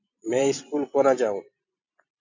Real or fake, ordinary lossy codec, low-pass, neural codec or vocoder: fake; AAC, 48 kbps; 7.2 kHz; vocoder, 44.1 kHz, 128 mel bands every 256 samples, BigVGAN v2